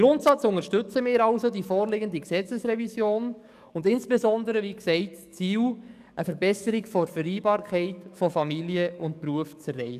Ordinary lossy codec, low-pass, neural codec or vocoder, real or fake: none; 14.4 kHz; codec, 44.1 kHz, 7.8 kbps, DAC; fake